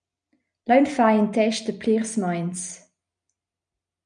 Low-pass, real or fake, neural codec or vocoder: 9.9 kHz; real; none